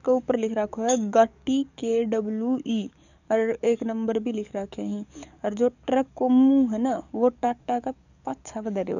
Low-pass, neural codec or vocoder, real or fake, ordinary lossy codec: 7.2 kHz; codec, 44.1 kHz, 7.8 kbps, DAC; fake; none